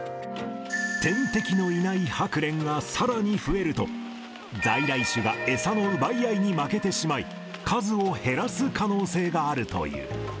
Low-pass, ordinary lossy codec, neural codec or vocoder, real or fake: none; none; none; real